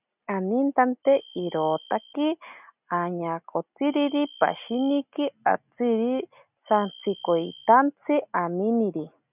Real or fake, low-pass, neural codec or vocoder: real; 3.6 kHz; none